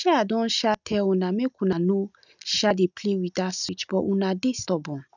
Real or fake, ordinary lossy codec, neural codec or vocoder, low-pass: real; none; none; 7.2 kHz